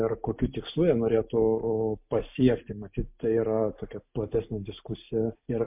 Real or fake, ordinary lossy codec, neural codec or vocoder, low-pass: real; MP3, 32 kbps; none; 3.6 kHz